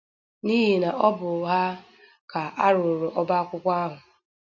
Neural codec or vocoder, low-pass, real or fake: none; 7.2 kHz; real